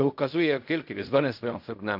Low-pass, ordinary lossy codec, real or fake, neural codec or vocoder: 5.4 kHz; MP3, 48 kbps; fake; codec, 16 kHz in and 24 kHz out, 0.4 kbps, LongCat-Audio-Codec, fine tuned four codebook decoder